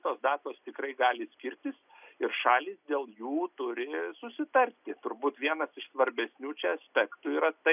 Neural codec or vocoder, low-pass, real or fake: none; 3.6 kHz; real